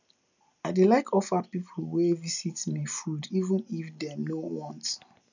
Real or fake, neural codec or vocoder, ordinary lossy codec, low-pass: real; none; none; 7.2 kHz